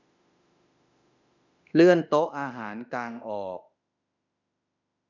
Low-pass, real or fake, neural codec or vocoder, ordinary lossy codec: 7.2 kHz; fake; autoencoder, 48 kHz, 32 numbers a frame, DAC-VAE, trained on Japanese speech; none